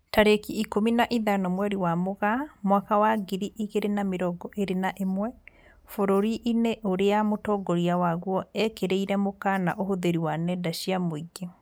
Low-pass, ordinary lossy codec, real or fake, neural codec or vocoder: none; none; real; none